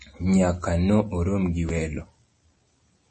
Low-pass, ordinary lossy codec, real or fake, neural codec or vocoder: 10.8 kHz; MP3, 32 kbps; real; none